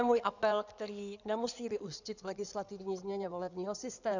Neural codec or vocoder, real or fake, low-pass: codec, 16 kHz in and 24 kHz out, 2.2 kbps, FireRedTTS-2 codec; fake; 7.2 kHz